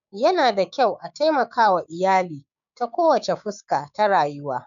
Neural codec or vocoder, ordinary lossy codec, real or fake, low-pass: codec, 16 kHz, 6 kbps, DAC; none; fake; 7.2 kHz